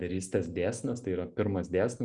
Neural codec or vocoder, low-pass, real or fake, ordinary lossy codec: none; 10.8 kHz; real; Opus, 64 kbps